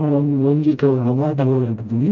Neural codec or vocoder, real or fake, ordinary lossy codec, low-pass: codec, 16 kHz, 0.5 kbps, FreqCodec, smaller model; fake; none; 7.2 kHz